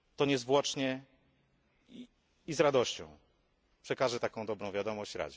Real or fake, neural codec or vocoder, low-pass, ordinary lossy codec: real; none; none; none